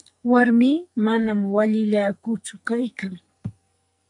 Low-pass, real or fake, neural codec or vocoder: 10.8 kHz; fake; codec, 44.1 kHz, 2.6 kbps, SNAC